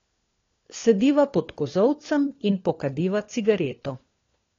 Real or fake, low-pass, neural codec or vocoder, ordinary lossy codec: fake; 7.2 kHz; codec, 16 kHz, 4 kbps, FunCodec, trained on LibriTTS, 50 frames a second; AAC, 32 kbps